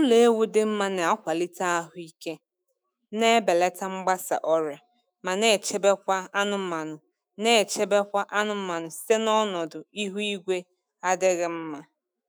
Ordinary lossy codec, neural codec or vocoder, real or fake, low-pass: none; autoencoder, 48 kHz, 128 numbers a frame, DAC-VAE, trained on Japanese speech; fake; none